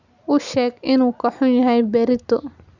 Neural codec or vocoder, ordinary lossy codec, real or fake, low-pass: none; none; real; 7.2 kHz